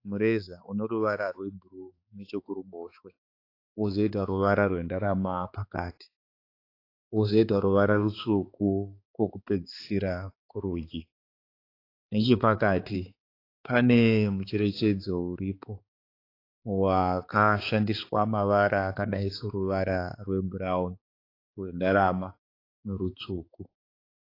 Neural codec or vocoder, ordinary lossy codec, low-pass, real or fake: codec, 16 kHz, 4 kbps, X-Codec, HuBERT features, trained on balanced general audio; AAC, 32 kbps; 5.4 kHz; fake